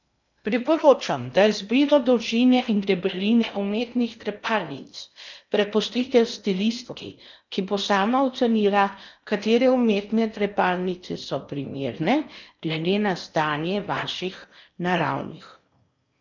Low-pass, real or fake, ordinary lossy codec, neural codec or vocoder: 7.2 kHz; fake; none; codec, 16 kHz in and 24 kHz out, 0.8 kbps, FocalCodec, streaming, 65536 codes